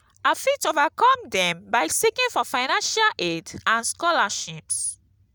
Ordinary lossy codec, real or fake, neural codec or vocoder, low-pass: none; real; none; none